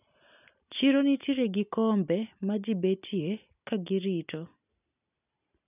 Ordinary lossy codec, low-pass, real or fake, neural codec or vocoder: none; 3.6 kHz; real; none